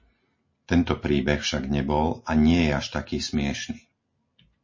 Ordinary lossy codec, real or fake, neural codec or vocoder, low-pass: MP3, 32 kbps; real; none; 7.2 kHz